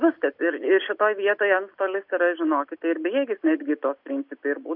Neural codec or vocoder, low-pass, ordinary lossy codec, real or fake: none; 5.4 kHz; AAC, 48 kbps; real